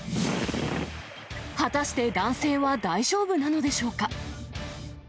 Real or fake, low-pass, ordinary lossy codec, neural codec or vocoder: real; none; none; none